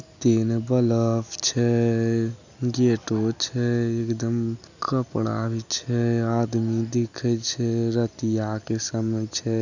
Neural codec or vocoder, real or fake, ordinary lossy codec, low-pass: none; real; none; 7.2 kHz